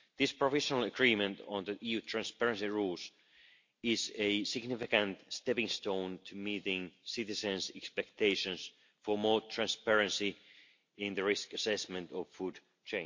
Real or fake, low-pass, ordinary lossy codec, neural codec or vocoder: real; 7.2 kHz; MP3, 48 kbps; none